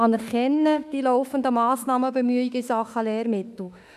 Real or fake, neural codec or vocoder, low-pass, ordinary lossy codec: fake; autoencoder, 48 kHz, 32 numbers a frame, DAC-VAE, trained on Japanese speech; 14.4 kHz; none